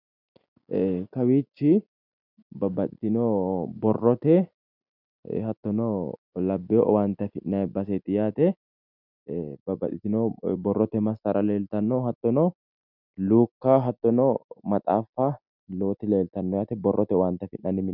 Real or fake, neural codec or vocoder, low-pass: real; none; 5.4 kHz